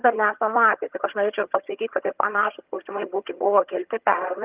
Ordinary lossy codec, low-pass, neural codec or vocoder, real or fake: Opus, 32 kbps; 3.6 kHz; vocoder, 22.05 kHz, 80 mel bands, HiFi-GAN; fake